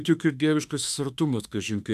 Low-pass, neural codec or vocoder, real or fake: 14.4 kHz; autoencoder, 48 kHz, 32 numbers a frame, DAC-VAE, trained on Japanese speech; fake